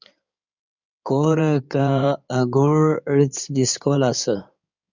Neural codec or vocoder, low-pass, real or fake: codec, 16 kHz in and 24 kHz out, 2.2 kbps, FireRedTTS-2 codec; 7.2 kHz; fake